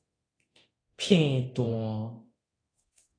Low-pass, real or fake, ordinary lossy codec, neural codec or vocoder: 9.9 kHz; fake; AAC, 32 kbps; codec, 24 kHz, 0.9 kbps, DualCodec